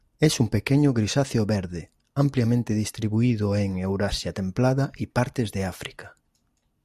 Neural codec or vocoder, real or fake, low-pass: none; real; 14.4 kHz